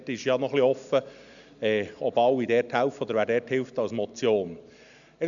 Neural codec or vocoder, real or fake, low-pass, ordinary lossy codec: none; real; 7.2 kHz; none